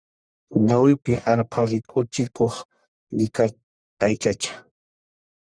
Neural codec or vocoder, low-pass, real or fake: codec, 44.1 kHz, 1.7 kbps, Pupu-Codec; 9.9 kHz; fake